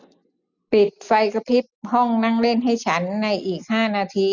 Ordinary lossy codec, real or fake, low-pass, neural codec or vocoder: none; real; 7.2 kHz; none